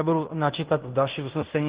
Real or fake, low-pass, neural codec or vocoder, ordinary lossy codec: fake; 3.6 kHz; codec, 16 kHz in and 24 kHz out, 0.9 kbps, LongCat-Audio-Codec, fine tuned four codebook decoder; Opus, 16 kbps